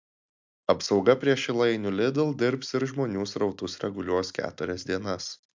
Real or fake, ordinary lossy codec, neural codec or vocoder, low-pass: real; MP3, 64 kbps; none; 7.2 kHz